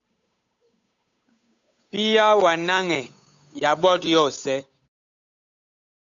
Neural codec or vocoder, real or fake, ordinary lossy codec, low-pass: codec, 16 kHz, 8 kbps, FunCodec, trained on Chinese and English, 25 frames a second; fake; AAC, 64 kbps; 7.2 kHz